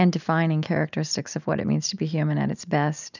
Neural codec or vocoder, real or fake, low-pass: none; real; 7.2 kHz